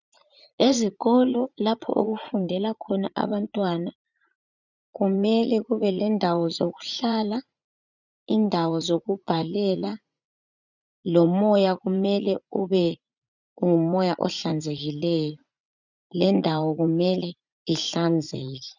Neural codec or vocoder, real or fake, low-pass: vocoder, 44.1 kHz, 80 mel bands, Vocos; fake; 7.2 kHz